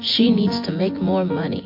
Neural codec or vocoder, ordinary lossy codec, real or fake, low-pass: vocoder, 24 kHz, 100 mel bands, Vocos; MP3, 32 kbps; fake; 5.4 kHz